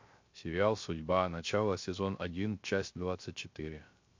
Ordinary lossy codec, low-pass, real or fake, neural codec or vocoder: MP3, 48 kbps; 7.2 kHz; fake; codec, 16 kHz, 0.3 kbps, FocalCodec